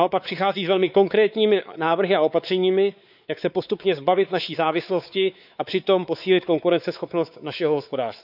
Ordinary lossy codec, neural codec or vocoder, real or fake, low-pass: none; codec, 16 kHz, 4 kbps, X-Codec, WavLM features, trained on Multilingual LibriSpeech; fake; 5.4 kHz